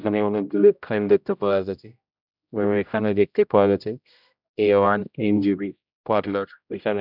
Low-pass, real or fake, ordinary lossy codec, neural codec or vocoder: 5.4 kHz; fake; none; codec, 16 kHz, 0.5 kbps, X-Codec, HuBERT features, trained on general audio